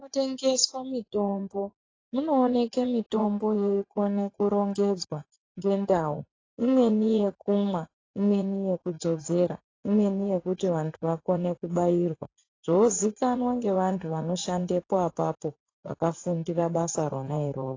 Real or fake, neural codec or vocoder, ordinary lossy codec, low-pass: fake; vocoder, 44.1 kHz, 80 mel bands, Vocos; AAC, 32 kbps; 7.2 kHz